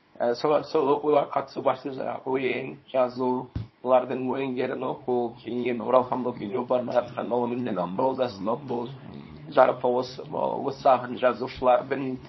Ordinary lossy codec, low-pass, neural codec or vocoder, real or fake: MP3, 24 kbps; 7.2 kHz; codec, 24 kHz, 0.9 kbps, WavTokenizer, small release; fake